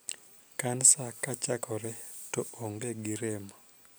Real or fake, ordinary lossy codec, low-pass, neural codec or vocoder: real; none; none; none